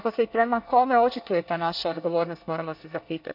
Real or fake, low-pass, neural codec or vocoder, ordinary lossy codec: fake; 5.4 kHz; codec, 24 kHz, 1 kbps, SNAC; none